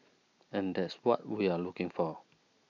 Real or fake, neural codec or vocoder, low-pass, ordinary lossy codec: real; none; 7.2 kHz; none